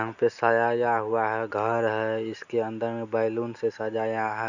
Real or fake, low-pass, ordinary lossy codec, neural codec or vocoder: real; 7.2 kHz; none; none